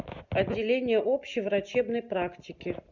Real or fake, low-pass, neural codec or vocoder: real; 7.2 kHz; none